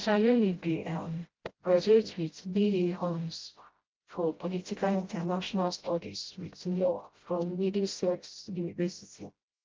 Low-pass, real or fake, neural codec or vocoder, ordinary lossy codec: 7.2 kHz; fake; codec, 16 kHz, 0.5 kbps, FreqCodec, smaller model; Opus, 32 kbps